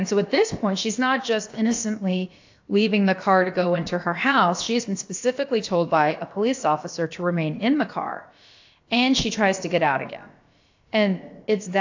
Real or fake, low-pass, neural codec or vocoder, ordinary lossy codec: fake; 7.2 kHz; codec, 16 kHz, about 1 kbps, DyCAST, with the encoder's durations; AAC, 48 kbps